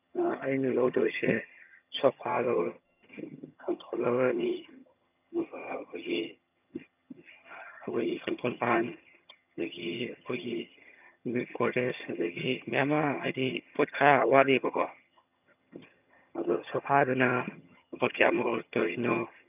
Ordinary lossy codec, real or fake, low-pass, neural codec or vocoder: none; fake; 3.6 kHz; vocoder, 22.05 kHz, 80 mel bands, HiFi-GAN